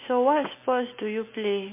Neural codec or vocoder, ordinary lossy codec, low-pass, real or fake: none; MP3, 24 kbps; 3.6 kHz; real